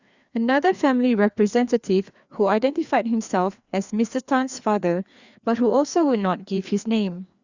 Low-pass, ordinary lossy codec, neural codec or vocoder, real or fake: 7.2 kHz; Opus, 64 kbps; codec, 16 kHz, 2 kbps, FreqCodec, larger model; fake